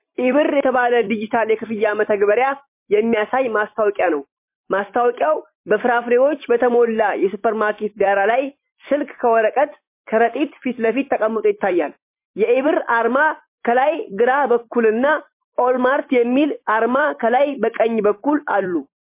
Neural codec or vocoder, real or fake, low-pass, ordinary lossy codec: vocoder, 44.1 kHz, 128 mel bands every 256 samples, BigVGAN v2; fake; 3.6 kHz; MP3, 24 kbps